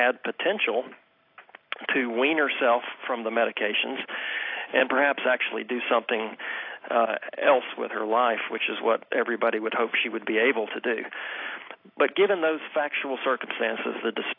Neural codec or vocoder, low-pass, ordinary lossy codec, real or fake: none; 5.4 kHz; AAC, 32 kbps; real